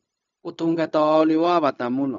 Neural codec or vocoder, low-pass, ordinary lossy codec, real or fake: codec, 16 kHz, 0.4 kbps, LongCat-Audio-Codec; 7.2 kHz; none; fake